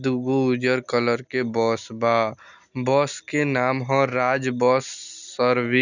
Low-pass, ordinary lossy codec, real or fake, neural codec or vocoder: 7.2 kHz; none; real; none